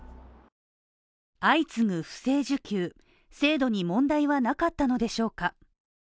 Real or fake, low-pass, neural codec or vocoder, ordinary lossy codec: real; none; none; none